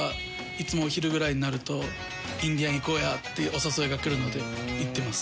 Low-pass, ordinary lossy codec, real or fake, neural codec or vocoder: none; none; real; none